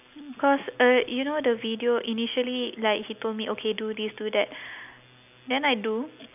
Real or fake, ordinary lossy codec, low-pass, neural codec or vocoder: real; none; 3.6 kHz; none